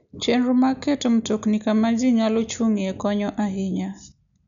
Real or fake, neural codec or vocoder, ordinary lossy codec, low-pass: real; none; none; 7.2 kHz